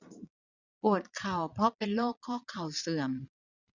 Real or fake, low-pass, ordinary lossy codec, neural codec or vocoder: fake; 7.2 kHz; none; vocoder, 22.05 kHz, 80 mel bands, Vocos